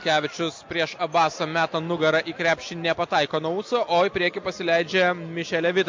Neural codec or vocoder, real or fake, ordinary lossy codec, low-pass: none; real; MP3, 48 kbps; 7.2 kHz